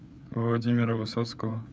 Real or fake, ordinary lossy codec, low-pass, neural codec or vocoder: fake; none; none; codec, 16 kHz, 4 kbps, FreqCodec, larger model